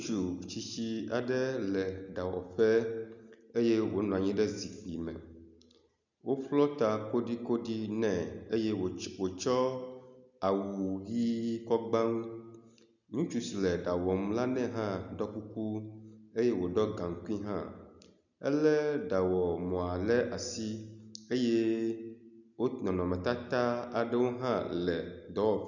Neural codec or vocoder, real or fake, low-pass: none; real; 7.2 kHz